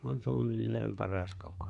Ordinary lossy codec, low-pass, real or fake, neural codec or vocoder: AAC, 64 kbps; 10.8 kHz; fake; codec, 24 kHz, 1 kbps, SNAC